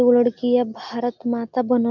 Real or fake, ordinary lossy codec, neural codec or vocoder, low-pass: real; none; none; 7.2 kHz